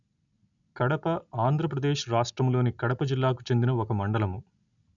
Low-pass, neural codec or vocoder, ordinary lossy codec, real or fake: 7.2 kHz; none; none; real